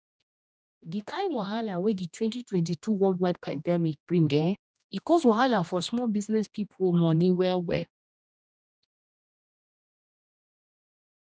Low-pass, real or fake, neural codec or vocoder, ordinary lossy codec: none; fake; codec, 16 kHz, 1 kbps, X-Codec, HuBERT features, trained on general audio; none